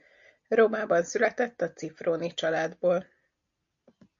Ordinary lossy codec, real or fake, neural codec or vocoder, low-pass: MP3, 48 kbps; real; none; 7.2 kHz